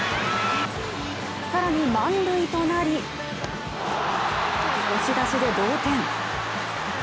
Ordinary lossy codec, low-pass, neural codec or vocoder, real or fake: none; none; none; real